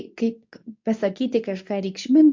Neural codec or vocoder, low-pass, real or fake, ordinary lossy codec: codec, 24 kHz, 0.9 kbps, WavTokenizer, medium speech release version 2; 7.2 kHz; fake; MP3, 48 kbps